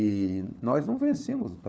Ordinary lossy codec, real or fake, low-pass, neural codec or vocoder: none; fake; none; codec, 16 kHz, 4 kbps, FunCodec, trained on LibriTTS, 50 frames a second